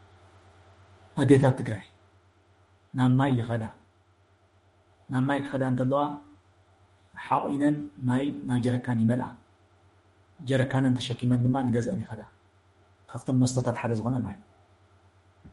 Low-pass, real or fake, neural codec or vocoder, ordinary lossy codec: 19.8 kHz; fake; autoencoder, 48 kHz, 32 numbers a frame, DAC-VAE, trained on Japanese speech; MP3, 48 kbps